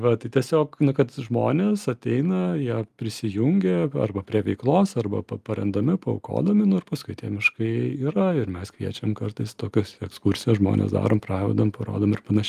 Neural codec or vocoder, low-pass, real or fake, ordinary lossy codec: none; 14.4 kHz; real; Opus, 32 kbps